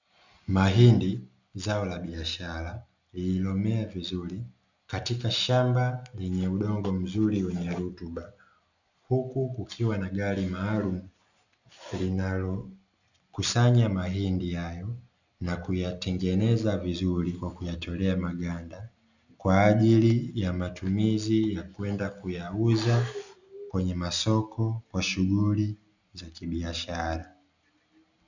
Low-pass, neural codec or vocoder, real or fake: 7.2 kHz; none; real